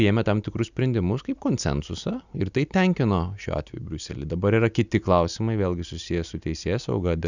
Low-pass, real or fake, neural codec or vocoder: 7.2 kHz; real; none